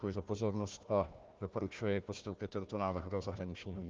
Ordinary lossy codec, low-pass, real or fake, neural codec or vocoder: Opus, 24 kbps; 7.2 kHz; fake; codec, 16 kHz, 1 kbps, FunCodec, trained on Chinese and English, 50 frames a second